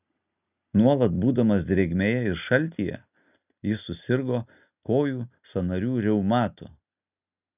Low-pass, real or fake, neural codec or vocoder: 3.6 kHz; real; none